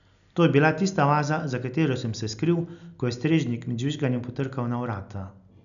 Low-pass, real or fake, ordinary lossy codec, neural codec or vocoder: 7.2 kHz; real; none; none